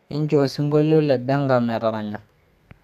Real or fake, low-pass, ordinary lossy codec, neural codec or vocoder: fake; 14.4 kHz; none; codec, 32 kHz, 1.9 kbps, SNAC